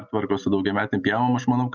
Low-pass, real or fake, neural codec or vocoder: 7.2 kHz; real; none